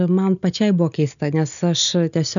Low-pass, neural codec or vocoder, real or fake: 7.2 kHz; none; real